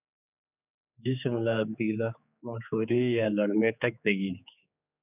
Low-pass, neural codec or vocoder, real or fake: 3.6 kHz; codec, 16 kHz, 4 kbps, X-Codec, HuBERT features, trained on general audio; fake